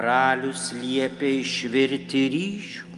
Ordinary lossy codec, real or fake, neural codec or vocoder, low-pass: Opus, 32 kbps; real; none; 10.8 kHz